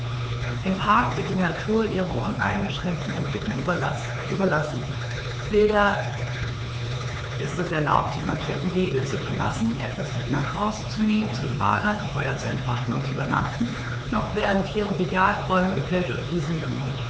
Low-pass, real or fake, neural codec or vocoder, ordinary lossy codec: none; fake; codec, 16 kHz, 4 kbps, X-Codec, HuBERT features, trained on LibriSpeech; none